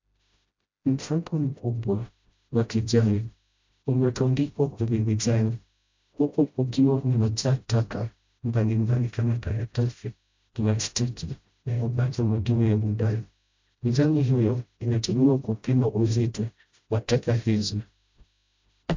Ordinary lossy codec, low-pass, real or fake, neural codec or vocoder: MP3, 64 kbps; 7.2 kHz; fake; codec, 16 kHz, 0.5 kbps, FreqCodec, smaller model